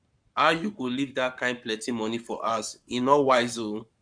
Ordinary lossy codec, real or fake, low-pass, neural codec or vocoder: none; fake; 9.9 kHz; codec, 44.1 kHz, 7.8 kbps, Pupu-Codec